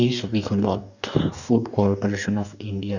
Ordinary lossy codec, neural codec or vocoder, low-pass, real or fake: none; codec, 44.1 kHz, 2.6 kbps, DAC; 7.2 kHz; fake